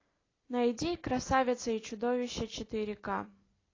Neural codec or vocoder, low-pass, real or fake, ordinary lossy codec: none; 7.2 kHz; real; AAC, 32 kbps